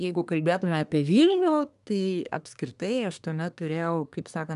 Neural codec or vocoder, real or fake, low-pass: codec, 24 kHz, 1 kbps, SNAC; fake; 10.8 kHz